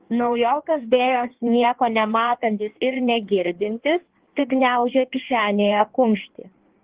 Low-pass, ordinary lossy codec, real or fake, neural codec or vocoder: 3.6 kHz; Opus, 16 kbps; fake; codec, 16 kHz in and 24 kHz out, 1.1 kbps, FireRedTTS-2 codec